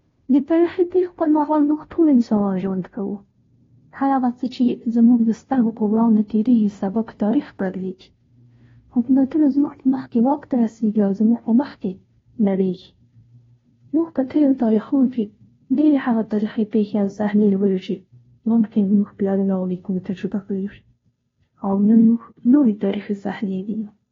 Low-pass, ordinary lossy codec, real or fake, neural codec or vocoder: 7.2 kHz; AAC, 32 kbps; fake; codec, 16 kHz, 0.5 kbps, FunCodec, trained on Chinese and English, 25 frames a second